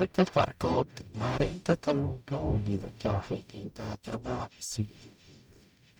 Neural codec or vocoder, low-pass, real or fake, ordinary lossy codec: codec, 44.1 kHz, 0.9 kbps, DAC; 19.8 kHz; fake; none